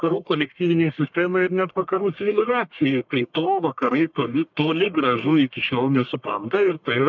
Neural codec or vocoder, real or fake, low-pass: codec, 44.1 kHz, 1.7 kbps, Pupu-Codec; fake; 7.2 kHz